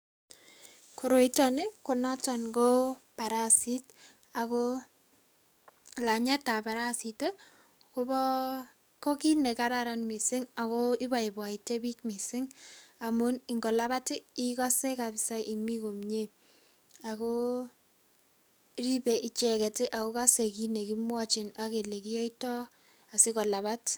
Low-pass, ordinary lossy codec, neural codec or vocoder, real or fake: none; none; codec, 44.1 kHz, 7.8 kbps, DAC; fake